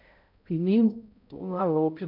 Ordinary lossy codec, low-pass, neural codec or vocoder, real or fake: none; 5.4 kHz; codec, 16 kHz, 0.5 kbps, X-Codec, HuBERT features, trained on balanced general audio; fake